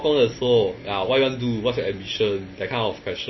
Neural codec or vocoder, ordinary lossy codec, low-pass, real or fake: none; MP3, 24 kbps; 7.2 kHz; real